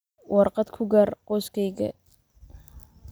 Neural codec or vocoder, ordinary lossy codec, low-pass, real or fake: none; none; none; real